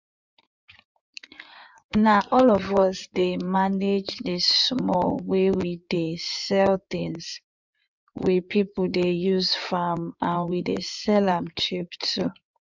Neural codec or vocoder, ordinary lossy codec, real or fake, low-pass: codec, 16 kHz in and 24 kHz out, 2.2 kbps, FireRedTTS-2 codec; none; fake; 7.2 kHz